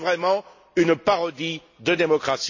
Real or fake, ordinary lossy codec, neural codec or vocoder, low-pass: real; AAC, 48 kbps; none; 7.2 kHz